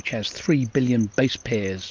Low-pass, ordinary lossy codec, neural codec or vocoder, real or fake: 7.2 kHz; Opus, 24 kbps; none; real